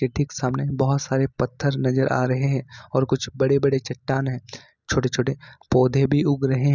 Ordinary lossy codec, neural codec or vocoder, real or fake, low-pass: none; none; real; 7.2 kHz